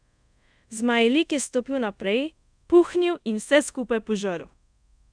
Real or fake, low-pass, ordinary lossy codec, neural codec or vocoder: fake; 9.9 kHz; none; codec, 24 kHz, 0.5 kbps, DualCodec